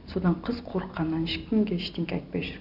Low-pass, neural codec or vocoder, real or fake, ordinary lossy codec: 5.4 kHz; none; real; none